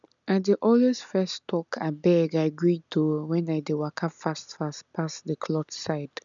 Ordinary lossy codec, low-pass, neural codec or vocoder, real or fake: none; 7.2 kHz; none; real